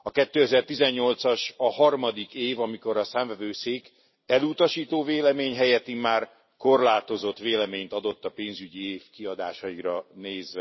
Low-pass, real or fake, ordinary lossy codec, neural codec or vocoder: 7.2 kHz; real; MP3, 24 kbps; none